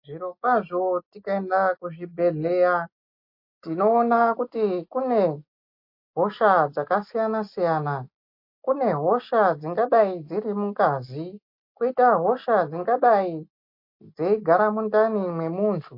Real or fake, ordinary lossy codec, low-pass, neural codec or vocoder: real; MP3, 32 kbps; 5.4 kHz; none